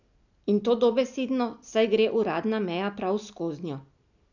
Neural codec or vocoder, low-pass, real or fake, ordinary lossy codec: none; 7.2 kHz; real; none